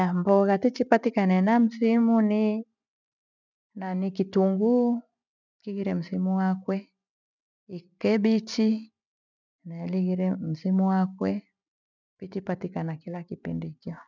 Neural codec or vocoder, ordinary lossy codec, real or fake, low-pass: none; none; real; 7.2 kHz